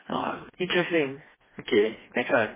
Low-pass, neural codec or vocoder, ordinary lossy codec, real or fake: 3.6 kHz; codec, 16 kHz, 2 kbps, FreqCodec, smaller model; MP3, 16 kbps; fake